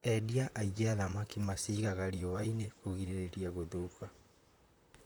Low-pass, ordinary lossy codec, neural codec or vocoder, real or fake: none; none; vocoder, 44.1 kHz, 128 mel bands, Pupu-Vocoder; fake